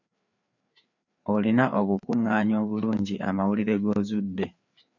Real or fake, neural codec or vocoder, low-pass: fake; codec, 16 kHz, 4 kbps, FreqCodec, larger model; 7.2 kHz